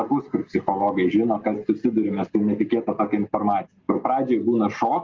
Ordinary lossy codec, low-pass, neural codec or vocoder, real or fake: Opus, 32 kbps; 7.2 kHz; none; real